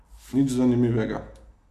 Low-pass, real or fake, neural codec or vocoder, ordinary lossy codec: 14.4 kHz; real; none; none